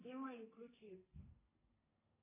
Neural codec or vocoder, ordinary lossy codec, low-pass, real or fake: codec, 32 kHz, 1.9 kbps, SNAC; AAC, 24 kbps; 3.6 kHz; fake